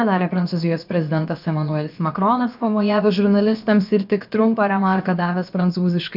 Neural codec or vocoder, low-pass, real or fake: codec, 16 kHz, about 1 kbps, DyCAST, with the encoder's durations; 5.4 kHz; fake